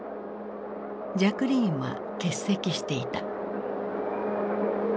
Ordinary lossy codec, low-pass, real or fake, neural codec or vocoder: none; none; real; none